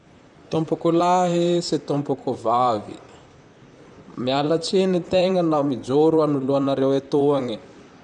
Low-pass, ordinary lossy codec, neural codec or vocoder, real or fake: 10.8 kHz; none; vocoder, 44.1 kHz, 128 mel bands, Pupu-Vocoder; fake